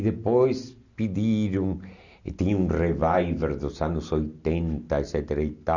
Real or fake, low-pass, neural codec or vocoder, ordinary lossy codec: real; 7.2 kHz; none; none